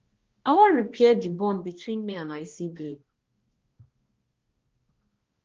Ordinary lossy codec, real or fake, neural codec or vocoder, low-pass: Opus, 16 kbps; fake; codec, 16 kHz, 1 kbps, X-Codec, HuBERT features, trained on balanced general audio; 7.2 kHz